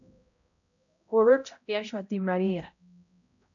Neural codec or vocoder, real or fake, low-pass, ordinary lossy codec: codec, 16 kHz, 0.5 kbps, X-Codec, HuBERT features, trained on balanced general audio; fake; 7.2 kHz; MP3, 96 kbps